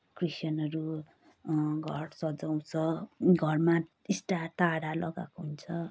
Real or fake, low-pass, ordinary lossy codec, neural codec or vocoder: real; none; none; none